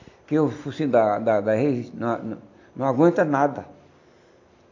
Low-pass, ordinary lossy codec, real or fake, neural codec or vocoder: 7.2 kHz; AAC, 48 kbps; real; none